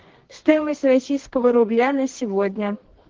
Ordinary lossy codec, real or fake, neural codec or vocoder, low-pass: Opus, 16 kbps; fake; codec, 24 kHz, 0.9 kbps, WavTokenizer, medium music audio release; 7.2 kHz